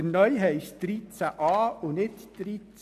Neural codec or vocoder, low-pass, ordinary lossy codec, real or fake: none; 14.4 kHz; none; real